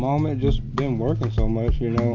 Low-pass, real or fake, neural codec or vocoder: 7.2 kHz; real; none